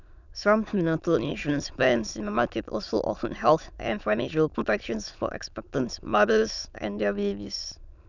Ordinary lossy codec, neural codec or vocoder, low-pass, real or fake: none; autoencoder, 22.05 kHz, a latent of 192 numbers a frame, VITS, trained on many speakers; 7.2 kHz; fake